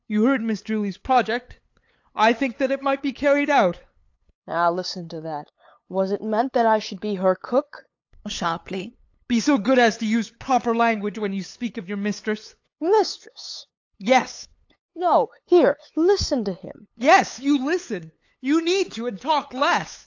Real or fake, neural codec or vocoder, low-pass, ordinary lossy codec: fake; codec, 16 kHz, 8 kbps, FunCodec, trained on LibriTTS, 25 frames a second; 7.2 kHz; AAC, 48 kbps